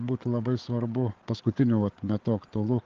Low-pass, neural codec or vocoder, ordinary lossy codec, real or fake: 7.2 kHz; codec, 16 kHz, 8 kbps, FreqCodec, larger model; Opus, 16 kbps; fake